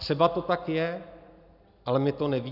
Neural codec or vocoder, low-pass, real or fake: none; 5.4 kHz; real